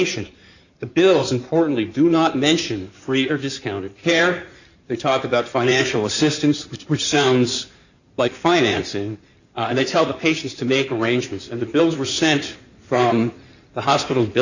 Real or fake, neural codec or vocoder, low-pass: fake; codec, 16 kHz in and 24 kHz out, 2.2 kbps, FireRedTTS-2 codec; 7.2 kHz